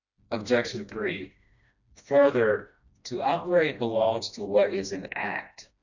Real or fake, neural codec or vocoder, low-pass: fake; codec, 16 kHz, 1 kbps, FreqCodec, smaller model; 7.2 kHz